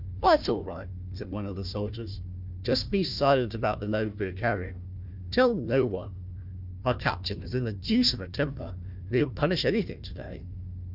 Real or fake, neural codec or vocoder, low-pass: fake; codec, 16 kHz, 1 kbps, FunCodec, trained on Chinese and English, 50 frames a second; 5.4 kHz